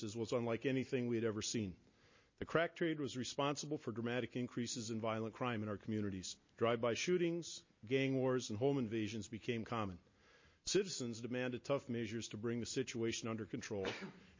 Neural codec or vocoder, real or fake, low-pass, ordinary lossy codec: none; real; 7.2 kHz; MP3, 32 kbps